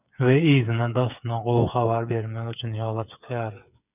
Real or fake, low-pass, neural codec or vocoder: fake; 3.6 kHz; codec, 16 kHz, 8 kbps, FreqCodec, smaller model